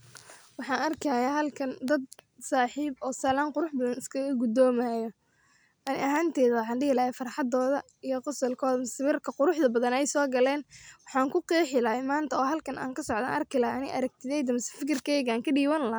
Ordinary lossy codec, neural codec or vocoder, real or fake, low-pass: none; none; real; none